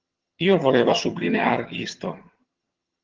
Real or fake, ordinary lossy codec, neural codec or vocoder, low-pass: fake; Opus, 16 kbps; vocoder, 22.05 kHz, 80 mel bands, HiFi-GAN; 7.2 kHz